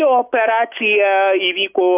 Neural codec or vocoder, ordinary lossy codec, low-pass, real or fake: autoencoder, 48 kHz, 128 numbers a frame, DAC-VAE, trained on Japanese speech; AAC, 32 kbps; 3.6 kHz; fake